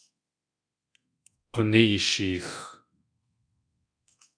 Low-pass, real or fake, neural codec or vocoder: 9.9 kHz; fake; codec, 24 kHz, 0.9 kbps, DualCodec